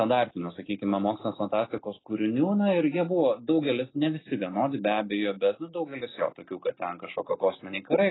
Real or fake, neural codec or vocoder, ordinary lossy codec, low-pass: real; none; AAC, 16 kbps; 7.2 kHz